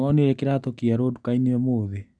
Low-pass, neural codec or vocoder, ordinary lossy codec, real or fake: 9.9 kHz; none; none; real